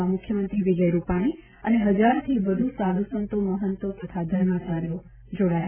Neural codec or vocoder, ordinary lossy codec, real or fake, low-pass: none; Opus, 64 kbps; real; 3.6 kHz